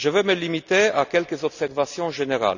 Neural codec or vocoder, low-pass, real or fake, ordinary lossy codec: none; 7.2 kHz; real; none